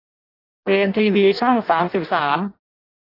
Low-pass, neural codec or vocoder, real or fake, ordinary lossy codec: 5.4 kHz; codec, 16 kHz in and 24 kHz out, 0.6 kbps, FireRedTTS-2 codec; fake; AAC, 32 kbps